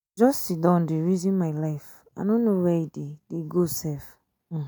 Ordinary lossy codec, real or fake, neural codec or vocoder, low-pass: none; real; none; none